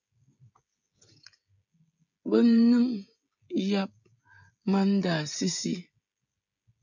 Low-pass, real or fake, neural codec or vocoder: 7.2 kHz; fake; codec, 16 kHz, 16 kbps, FreqCodec, smaller model